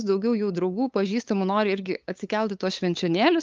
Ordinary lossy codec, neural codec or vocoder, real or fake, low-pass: Opus, 24 kbps; codec, 16 kHz, 8 kbps, FunCodec, trained on LibriTTS, 25 frames a second; fake; 7.2 kHz